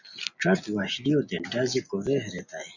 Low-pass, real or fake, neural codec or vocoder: 7.2 kHz; real; none